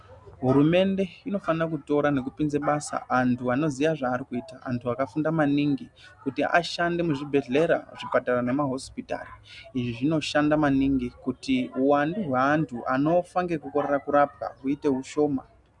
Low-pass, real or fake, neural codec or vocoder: 10.8 kHz; real; none